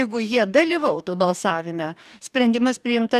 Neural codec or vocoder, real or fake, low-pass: codec, 44.1 kHz, 2.6 kbps, DAC; fake; 14.4 kHz